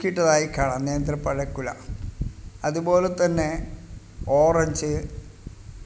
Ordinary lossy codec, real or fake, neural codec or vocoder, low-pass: none; real; none; none